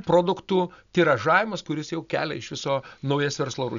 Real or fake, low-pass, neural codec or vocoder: real; 7.2 kHz; none